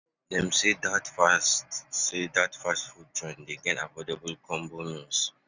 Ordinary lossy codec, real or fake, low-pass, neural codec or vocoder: none; real; 7.2 kHz; none